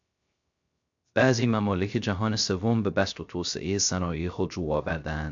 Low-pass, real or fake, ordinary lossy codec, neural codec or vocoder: 7.2 kHz; fake; AAC, 64 kbps; codec, 16 kHz, 0.3 kbps, FocalCodec